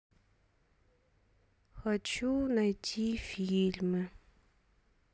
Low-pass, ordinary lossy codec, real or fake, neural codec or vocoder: none; none; real; none